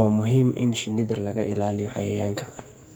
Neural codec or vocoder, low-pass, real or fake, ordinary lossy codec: codec, 44.1 kHz, 2.6 kbps, SNAC; none; fake; none